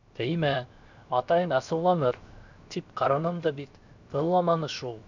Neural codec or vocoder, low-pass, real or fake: codec, 16 kHz, 0.7 kbps, FocalCodec; 7.2 kHz; fake